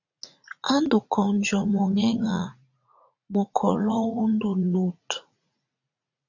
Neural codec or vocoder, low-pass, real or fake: vocoder, 44.1 kHz, 80 mel bands, Vocos; 7.2 kHz; fake